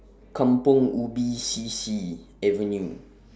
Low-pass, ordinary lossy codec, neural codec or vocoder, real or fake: none; none; none; real